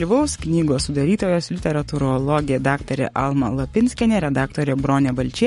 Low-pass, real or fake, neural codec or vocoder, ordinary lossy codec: 19.8 kHz; fake; codec, 44.1 kHz, 7.8 kbps, Pupu-Codec; MP3, 48 kbps